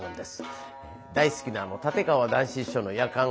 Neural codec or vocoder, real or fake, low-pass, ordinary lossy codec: none; real; none; none